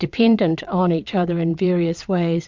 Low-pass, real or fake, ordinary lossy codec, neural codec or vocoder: 7.2 kHz; fake; MP3, 64 kbps; vocoder, 22.05 kHz, 80 mel bands, WaveNeXt